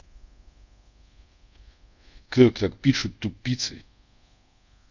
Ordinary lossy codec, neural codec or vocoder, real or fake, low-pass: none; codec, 24 kHz, 0.5 kbps, DualCodec; fake; 7.2 kHz